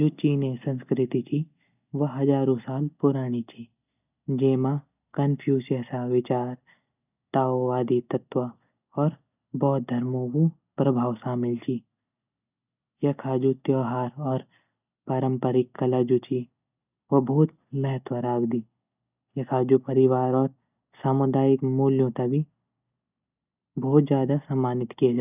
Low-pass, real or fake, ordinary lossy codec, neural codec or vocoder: 3.6 kHz; real; none; none